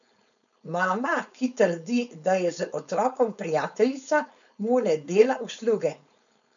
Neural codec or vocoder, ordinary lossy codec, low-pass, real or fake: codec, 16 kHz, 4.8 kbps, FACodec; none; 7.2 kHz; fake